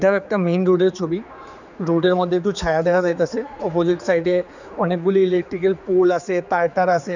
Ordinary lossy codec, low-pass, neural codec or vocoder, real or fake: none; 7.2 kHz; codec, 16 kHz, 4 kbps, X-Codec, HuBERT features, trained on general audio; fake